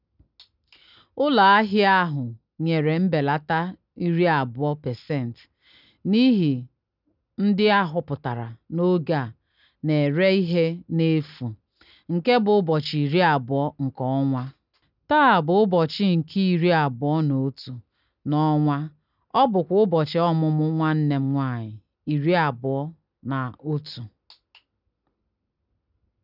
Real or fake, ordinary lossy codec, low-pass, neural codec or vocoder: real; none; 5.4 kHz; none